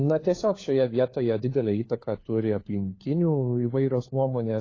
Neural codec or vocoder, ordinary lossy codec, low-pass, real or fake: codec, 16 kHz, 4 kbps, FunCodec, trained on LibriTTS, 50 frames a second; AAC, 32 kbps; 7.2 kHz; fake